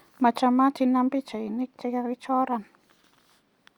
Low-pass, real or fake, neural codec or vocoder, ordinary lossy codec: 19.8 kHz; real; none; Opus, 64 kbps